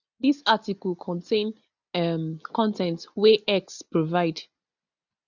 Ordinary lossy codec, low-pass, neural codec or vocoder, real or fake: none; 7.2 kHz; none; real